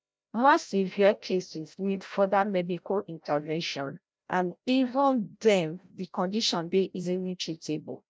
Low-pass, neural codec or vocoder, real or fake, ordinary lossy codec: none; codec, 16 kHz, 0.5 kbps, FreqCodec, larger model; fake; none